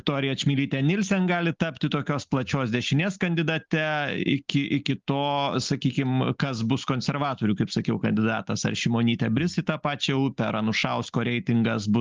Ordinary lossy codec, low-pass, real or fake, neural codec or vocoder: Opus, 32 kbps; 7.2 kHz; real; none